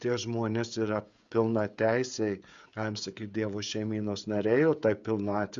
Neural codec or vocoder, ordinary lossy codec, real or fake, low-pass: codec, 16 kHz, 16 kbps, FreqCodec, smaller model; Opus, 64 kbps; fake; 7.2 kHz